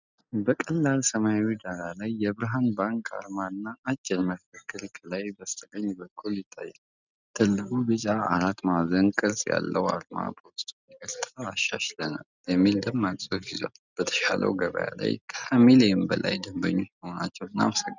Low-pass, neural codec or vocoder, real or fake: 7.2 kHz; none; real